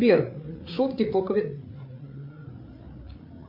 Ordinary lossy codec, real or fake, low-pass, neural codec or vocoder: MP3, 32 kbps; fake; 5.4 kHz; codec, 16 kHz, 8 kbps, FreqCodec, larger model